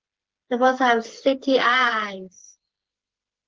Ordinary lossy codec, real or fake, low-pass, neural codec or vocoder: Opus, 16 kbps; fake; 7.2 kHz; codec, 16 kHz, 4 kbps, FreqCodec, smaller model